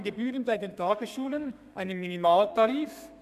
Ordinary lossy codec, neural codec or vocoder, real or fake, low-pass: none; codec, 32 kHz, 1.9 kbps, SNAC; fake; 14.4 kHz